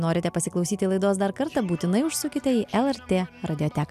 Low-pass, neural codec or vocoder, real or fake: 14.4 kHz; none; real